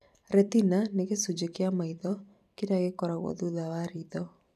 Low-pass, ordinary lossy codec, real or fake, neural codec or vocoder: 14.4 kHz; none; fake; vocoder, 44.1 kHz, 128 mel bands every 256 samples, BigVGAN v2